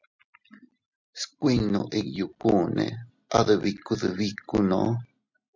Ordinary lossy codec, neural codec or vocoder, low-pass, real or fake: MP3, 64 kbps; none; 7.2 kHz; real